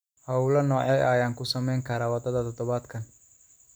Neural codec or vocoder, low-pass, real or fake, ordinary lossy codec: none; none; real; none